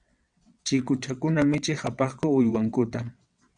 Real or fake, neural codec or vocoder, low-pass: fake; vocoder, 22.05 kHz, 80 mel bands, WaveNeXt; 9.9 kHz